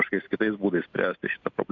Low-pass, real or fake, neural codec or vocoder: 7.2 kHz; real; none